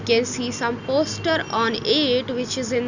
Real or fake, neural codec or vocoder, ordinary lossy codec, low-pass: real; none; none; 7.2 kHz